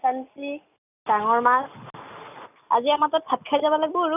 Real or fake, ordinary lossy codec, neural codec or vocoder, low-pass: real; none; none; 3.6 kHz